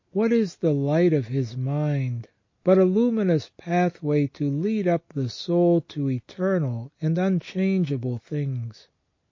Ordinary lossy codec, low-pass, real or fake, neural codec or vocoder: MP3, 32 kbps; 7.2 kHz; real; none